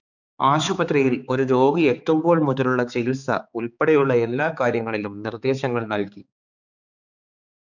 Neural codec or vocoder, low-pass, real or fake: codec, 16 kHz, 4 kbps, X-Codec, HuBERT features, trained on balanced general audio; 7.2 kHz; fake